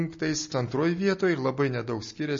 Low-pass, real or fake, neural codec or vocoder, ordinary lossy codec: 7.2 kHz; real; none; MP3, 32 kbps